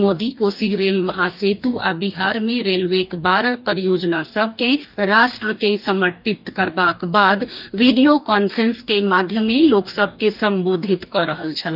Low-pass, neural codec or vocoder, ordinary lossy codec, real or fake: 5.4 kHz; codec, 44.1 kHz, 2.6 kbps, DAC; none; fake